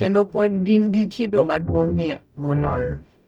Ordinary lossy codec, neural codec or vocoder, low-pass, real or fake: none; codec, 44.1 kHz, 0.9 kbps, DAC; 19.8 kHz; fake